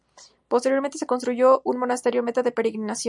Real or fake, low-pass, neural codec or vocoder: real; 10.8 kHz; none